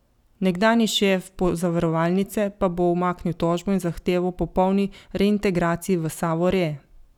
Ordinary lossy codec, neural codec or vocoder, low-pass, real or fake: none; none; 19.8 kHz; real